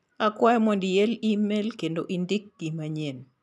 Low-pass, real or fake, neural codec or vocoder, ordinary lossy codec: none; real; none; none